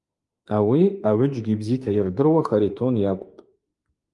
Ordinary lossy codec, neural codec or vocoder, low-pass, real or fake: Opus, 32 kbps; autoencoder, 48 kHz, 32 numbers a frame, DAC-VAE, trained on Japanese speech; 10.8 kHz; fake